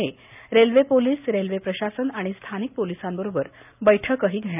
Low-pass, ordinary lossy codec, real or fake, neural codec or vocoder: 3.6 kHz; none; real; none